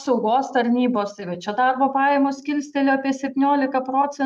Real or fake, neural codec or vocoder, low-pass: real; none; 14.4 kHz